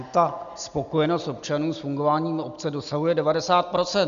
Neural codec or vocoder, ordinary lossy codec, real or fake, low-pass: none; MP3, 96 kbps; real; 7.2 kHz